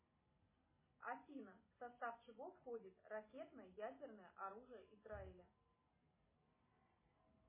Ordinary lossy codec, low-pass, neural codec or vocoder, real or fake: MP3, 16 kbps; 3.6 kHz; none; real